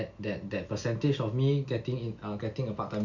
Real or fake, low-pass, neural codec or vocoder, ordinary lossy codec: real; 7.2 kHz; none; none